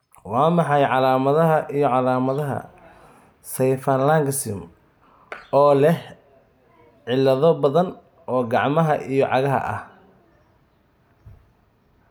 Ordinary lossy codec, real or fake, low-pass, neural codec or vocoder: none; real; none; none